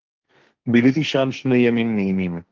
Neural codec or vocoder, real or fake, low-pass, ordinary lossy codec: codec, 16 kHz, 1.1 kbps, Voila-Tokenizer; fake; 7.2 kHz; Opus, 32 kbps